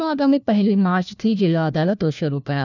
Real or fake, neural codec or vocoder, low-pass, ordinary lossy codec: fake; codec, 16 kHz, 1 kbps, FunCodec, trained on LibriTTS, 50 frames a second; 7.2 kHz; none